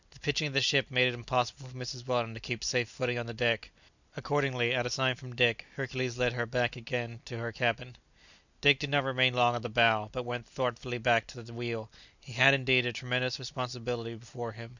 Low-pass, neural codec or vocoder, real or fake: 7.2 kHz; none; real